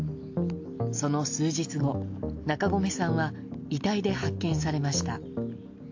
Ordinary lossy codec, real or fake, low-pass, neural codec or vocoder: AAC, 48 kbps; real; 7.2 kHz; none